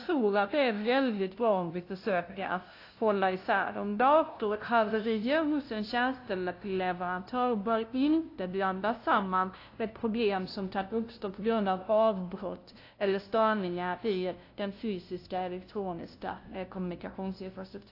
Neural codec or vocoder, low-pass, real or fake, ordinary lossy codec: codec, 16 kHz, 0.5 kbps, FunCodec, trained on LibriTTS, 25 frames a second; 5.4 kHz; fake; AAC, 32 kbps